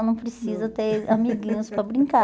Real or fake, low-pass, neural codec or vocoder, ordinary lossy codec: real; none; none; none